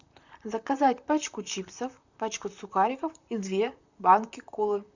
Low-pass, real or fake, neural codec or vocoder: 7.2 kHz; fake; vocoder, 44.1 kHz, 128 mel bands, Pupu-Vocoder